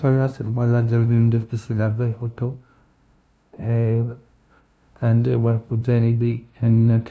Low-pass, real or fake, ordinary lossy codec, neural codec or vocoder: none; fake; none; codec, 16 kHz, 0.5 kbps, FunCodec, trained on LibriTTS, 25 frames a second